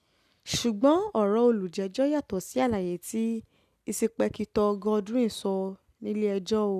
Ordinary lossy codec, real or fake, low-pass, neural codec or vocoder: none; real; 14.4 kHz; none